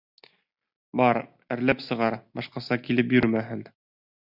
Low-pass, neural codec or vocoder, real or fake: 5.4 kHz; none; real